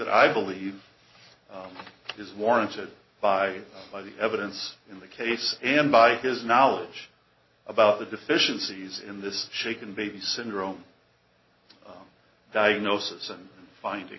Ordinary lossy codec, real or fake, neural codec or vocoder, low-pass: MP3, 24 kbps; real; none; 7.2 kHz